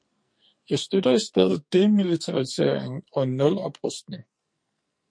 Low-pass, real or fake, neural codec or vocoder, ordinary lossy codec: 9.9 kHz; fake; codec, 44.1 kHz, 2.6 kbps, SNAC; MP3, 48 kbps